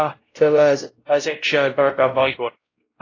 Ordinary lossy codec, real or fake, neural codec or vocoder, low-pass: AAC, 32 kbps; fake; codec, 16 kHz, 0.5 kbps, X-Codec, WavLM features, trained on Multilingual LibriSpeech; 7.2 kHz